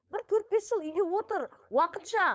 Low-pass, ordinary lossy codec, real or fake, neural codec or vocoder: none; none; fake; codec, 16 kHz, 4.8 kbps, FACodec